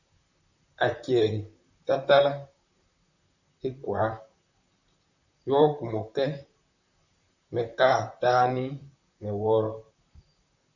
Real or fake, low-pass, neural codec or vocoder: fake; 7.2 kHz; vocoder, 44.1 kHz, 128 mel bands, Pupu-Vocoder